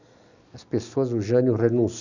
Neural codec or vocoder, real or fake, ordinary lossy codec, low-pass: none; real; none; 7.2 kHz